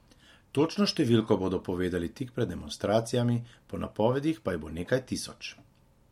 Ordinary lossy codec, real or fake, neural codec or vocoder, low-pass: MP3, 64 kbps; real; none; 19.8 kHz